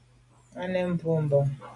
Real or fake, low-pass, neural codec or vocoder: real; 10.8 kHz; none